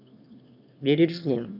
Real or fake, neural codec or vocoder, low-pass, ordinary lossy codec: fake; autoencoder, 22.05 kHz, a latent of 192 numbers a frame, VITS, trained on one speaker; 5.4 kHz; none